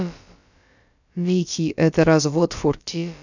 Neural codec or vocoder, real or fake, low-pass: codec, 16 kHz, about 1 kbps, DyCAST, with the encoder's durations; fake; 7.2 kHz